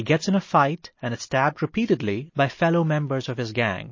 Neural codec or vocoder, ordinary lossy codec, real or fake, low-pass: none; MP3, 32 kbps; real; 7.2 kHz